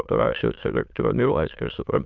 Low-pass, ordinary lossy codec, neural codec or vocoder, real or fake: 7.2 kHz; Opus, 24 kbps; autoencoder, 22.05 kHz, a latent of 192 numbers a frame, VITS, trained on many speakers; fake